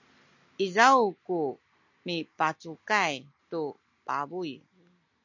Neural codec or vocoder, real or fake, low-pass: none; real; 7.2 kHz